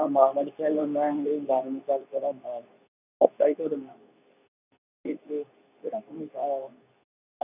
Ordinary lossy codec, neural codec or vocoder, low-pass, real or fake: none; codec, 24 kHz, 0.9 kbps, WavTokenizer, medium speech release version 2; 3.6 kHz; fake